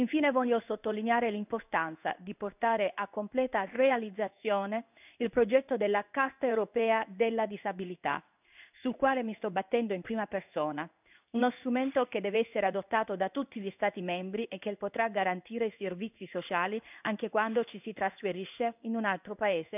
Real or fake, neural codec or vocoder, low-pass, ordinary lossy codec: fake; codec, 16 kHz in and 24 kHz out, 1 kbps, XY-Tokenizer; 3.6 kHz; none